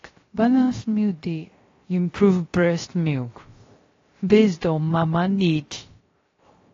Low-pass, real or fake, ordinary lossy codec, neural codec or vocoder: 7.2 kHz; fake; AAC, 32 kbps; codec, 16 kHz, 0.3 kbps, FocalCodec